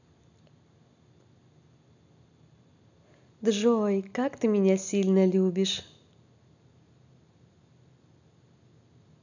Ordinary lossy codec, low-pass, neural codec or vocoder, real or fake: none; 7.2 kHz; none; real